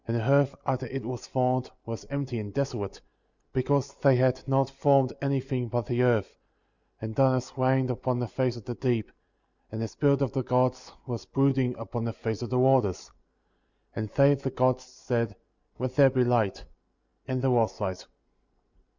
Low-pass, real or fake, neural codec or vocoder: 7.2 kHz; real; none